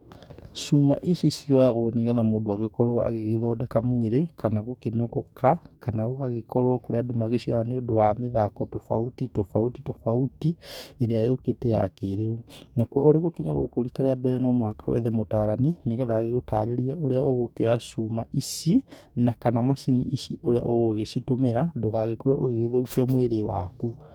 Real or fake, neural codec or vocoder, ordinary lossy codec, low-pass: fake; codec, 44.1 kHz, 2.6 kbps, DAC; none; 19.8 kHz